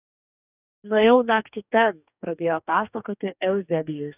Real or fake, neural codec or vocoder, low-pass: fake; codec, 44.1 kHz, 2.6 kbps, DAC; 3.6 kHz